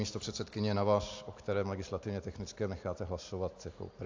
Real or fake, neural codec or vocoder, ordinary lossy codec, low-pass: real; none; AAC, 48 kbps; 7.2 kHz